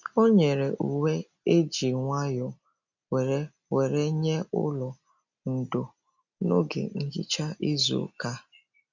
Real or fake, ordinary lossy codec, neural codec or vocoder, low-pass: real; none; none; 7.2 kHz